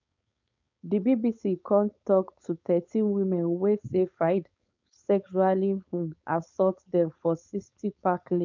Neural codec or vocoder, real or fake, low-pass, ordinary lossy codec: codec, 16 kHz, 4.8 kbps, FACodec; fake; 7.2 kHz; none